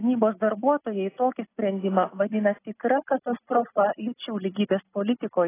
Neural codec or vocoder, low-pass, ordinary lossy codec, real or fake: none; 3.6 kHz; AAC, 16 kbps; real